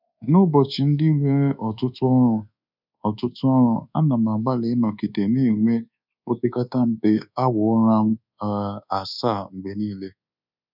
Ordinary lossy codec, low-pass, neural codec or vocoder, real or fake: none; 5.4 kHz; codec, 24 kHz, 1.2 kbps, DualCodec; fake